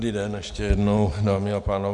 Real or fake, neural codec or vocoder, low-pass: fake; vocoder, 44.1 kHz, 128 mel bands every 256 samples, BigVGAN v2; 10.8 kHz